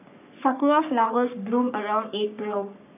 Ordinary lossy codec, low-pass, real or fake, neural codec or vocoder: none; 3.6 kHz; fake; codec, 44.1 kHz, 3.4 kbps, Pupu-Codec